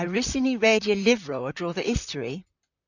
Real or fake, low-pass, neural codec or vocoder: fake; 7.2 kHz; vocoder, 44.1 kHz, 128 mel bands, Pupu-Vocoder